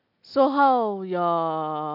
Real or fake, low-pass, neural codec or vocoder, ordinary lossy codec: real; 5.4 kHz; none; none